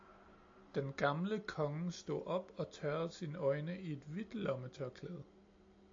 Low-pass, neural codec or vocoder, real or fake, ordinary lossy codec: 7.2 kHz; none; real; AAC, 48 kbps